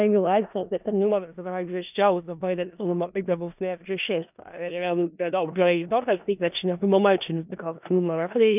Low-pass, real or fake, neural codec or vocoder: 3.6 kHz; fake; codec, 16 kHz in and 24 kHz out, 0.4 kbps, LongCat-Audio-Codec, four codebook decoder